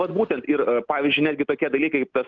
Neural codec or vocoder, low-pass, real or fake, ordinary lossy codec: none; 7.2 kHz; real; Opus, 24 kbps